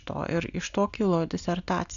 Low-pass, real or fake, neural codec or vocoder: 7.2 kHz; real; none